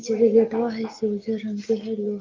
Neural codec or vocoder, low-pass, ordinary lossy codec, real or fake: none; 7.2 kHz; Opus, 32 kbps; real